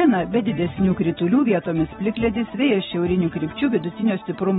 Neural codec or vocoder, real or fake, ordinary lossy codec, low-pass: none; real; AAC, 16 kbps; 10.8 kHz